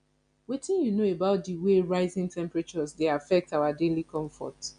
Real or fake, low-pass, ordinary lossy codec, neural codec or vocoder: real; 9.9 kHz; none; none